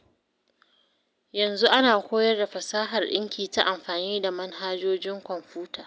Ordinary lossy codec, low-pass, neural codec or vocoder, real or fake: none; none; none; real